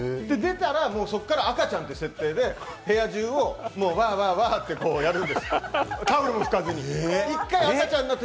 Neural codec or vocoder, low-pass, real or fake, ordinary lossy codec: none; none; real; none